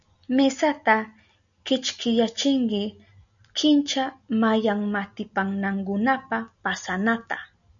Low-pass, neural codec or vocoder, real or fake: 7.2 kHz; none; real